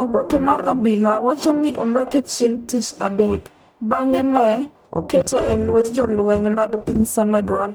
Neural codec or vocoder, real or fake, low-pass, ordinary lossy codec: codec, 44.1 kHz, 0.9 kbps, DAC; fake; none; none